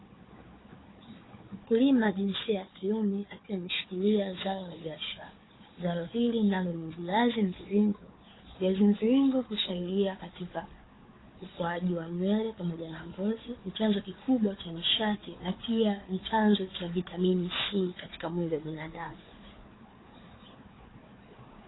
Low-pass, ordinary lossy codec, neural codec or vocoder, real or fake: 7.2 kHz; AAC, 16 kbps; codec, 16 kHz, 4 kbps, FunCodec, trained on Chinese and English, 50 frames a second; fake